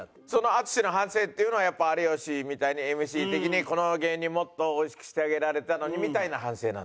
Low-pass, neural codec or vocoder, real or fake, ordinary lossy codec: none; none; real; none